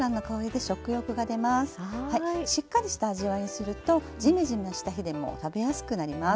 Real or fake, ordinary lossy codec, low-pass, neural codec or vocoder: real; none; none; none